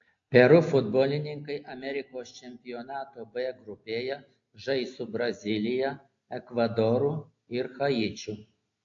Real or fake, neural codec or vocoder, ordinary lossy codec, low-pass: real; none; AAC, 48 kbps; 7.2 kHz